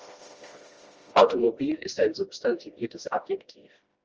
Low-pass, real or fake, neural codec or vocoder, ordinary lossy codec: 7.2 kHz; fake; codec, 16 kHz, 1 kbps, FreqCodec, smaller model; Opus, 24 kbps